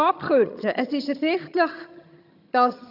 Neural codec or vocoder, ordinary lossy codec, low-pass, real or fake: vocoder, 22.05 kHz, 80 mel bands, HiFi-GAN; none; 5.4 kHz; fake